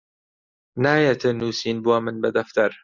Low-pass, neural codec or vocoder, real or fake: 7.2 kHz; none; real